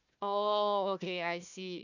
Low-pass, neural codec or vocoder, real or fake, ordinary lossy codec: 7.2 kHz; codec, 16 kHz, 1 kbps, FunCodec, trained on Chinese and English, 50 frames a second; fake; none